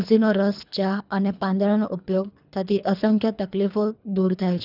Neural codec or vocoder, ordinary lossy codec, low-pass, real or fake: codec, 24 kHz, 3 kbps, HILCodec; none; 5.4 kHz; fake